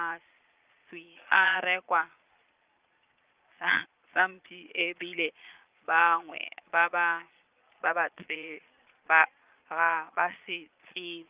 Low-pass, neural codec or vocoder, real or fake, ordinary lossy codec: 3.6 kHz; vocoder, 22.05 kHz, 80 mel bands, Vocos; fake; Opus, 24 kbps